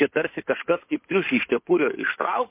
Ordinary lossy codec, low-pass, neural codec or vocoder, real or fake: MP3, 24 kbps; 3.6 kHz; codec, 16 kHz in and 24 kHz out, 2.2 kbps, FireRedTTS-2 codec; fake